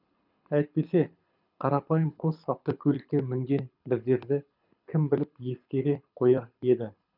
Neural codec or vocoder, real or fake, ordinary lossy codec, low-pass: codec, 24 kHz, 6 kbps, HILCodec; fake; none; 5.4 kHz